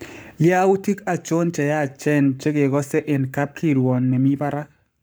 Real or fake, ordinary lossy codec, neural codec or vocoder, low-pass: fake; none; codec, 44.1 kHz, 7.8 kbps, Pupu-Codec; none